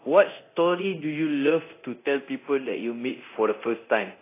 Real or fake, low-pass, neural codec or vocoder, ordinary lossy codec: fake; 3.6 kHz; codec, 24 kHz, 0.9 kbps, DualCodec; AAC, 24 kbps